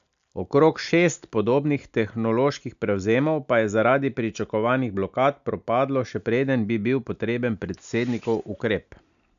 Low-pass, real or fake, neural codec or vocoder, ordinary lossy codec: 7.2 kHz; real; none; none